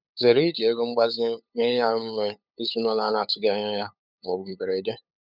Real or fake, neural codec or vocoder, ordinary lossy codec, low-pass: fake; codec, 16 kHz, 8 kbps, FunCodec, trained on LibriTTS, 25 frames a second; none; 5.4 kHz